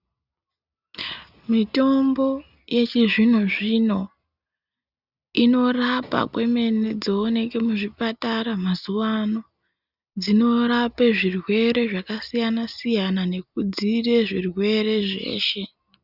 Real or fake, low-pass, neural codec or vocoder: real; 5.4 kHz; none